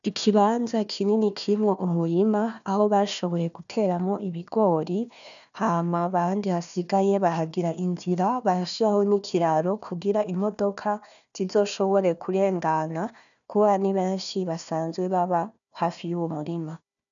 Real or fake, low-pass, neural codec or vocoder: fake; 7.2 kHz; codec, 16 kHz, 1 kbps, FunCodec, trained on Chinese and English, 50 frames a second